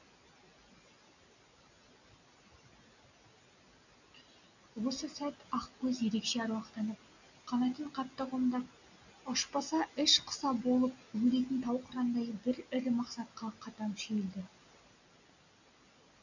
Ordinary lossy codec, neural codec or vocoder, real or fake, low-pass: none; none; real; 7.2 kHz